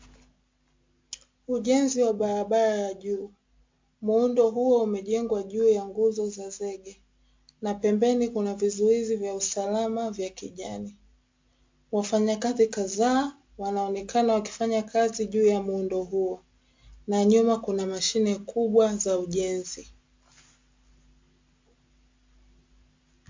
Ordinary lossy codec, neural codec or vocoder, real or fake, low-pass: MP3, 48 kbps; none; real; 7.2 kHz